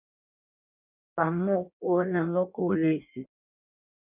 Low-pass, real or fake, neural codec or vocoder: 3.6 kHz; fake; codec, 16 kHz in and 24 kHz out, 0.6 kbps, FireRedTTS-2 codec